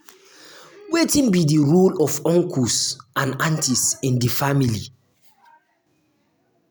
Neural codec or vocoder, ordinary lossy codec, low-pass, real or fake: none; none; none; real